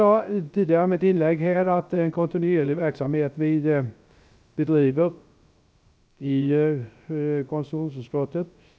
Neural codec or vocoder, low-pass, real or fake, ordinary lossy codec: codec, 16 kHz, 0.3 kbps, FocalCodec; none; fake; none